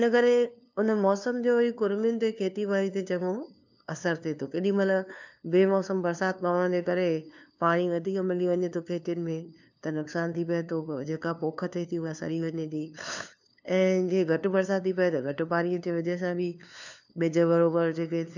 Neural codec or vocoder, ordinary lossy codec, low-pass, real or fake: codec, 16 kHz, 2 kbps, FunCodec, trained on LibriTTS, 25 frames a second; none; 7.2 kHz; fake